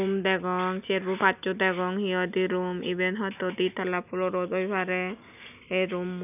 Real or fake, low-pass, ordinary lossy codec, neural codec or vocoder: real; 3.6 kHz; none; none